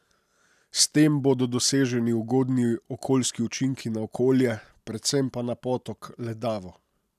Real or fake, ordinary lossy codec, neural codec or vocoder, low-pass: real; none; none; 14.4 kHz